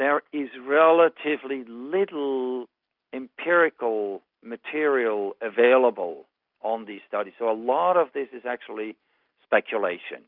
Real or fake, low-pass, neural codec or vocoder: real; 5.4 kHz; none